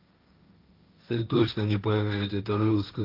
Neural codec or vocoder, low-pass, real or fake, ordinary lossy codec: codec, 16 kHz, 1.1 kbps, Voila-Tokenizer; 5.4 kHz; fake; Opus, 24 kbps